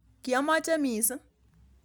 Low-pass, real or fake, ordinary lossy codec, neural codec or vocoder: none; real; none; none